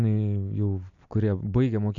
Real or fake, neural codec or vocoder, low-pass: real; none; 7.2 kHz